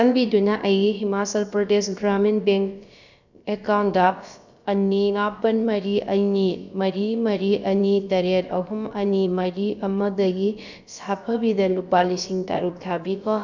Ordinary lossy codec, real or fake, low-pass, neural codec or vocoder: none; fake; 7.2 kHz; codec, 16 kHz, about 1 kbps, DyCAST, with the encoder's durations